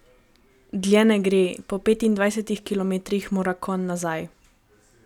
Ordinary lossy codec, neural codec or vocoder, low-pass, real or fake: none; none; 19.8 kHz; real